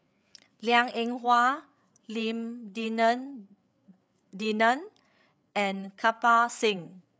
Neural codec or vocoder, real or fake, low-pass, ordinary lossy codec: codec, 16 kHz, 8 kbps, FreqCodec, larger model; fake; none; none